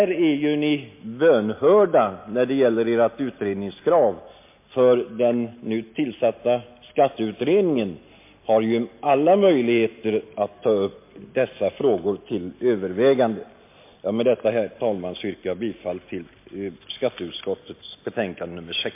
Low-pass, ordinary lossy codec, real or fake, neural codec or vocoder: 3.6 kHz; MP3, 24 kbps; real; none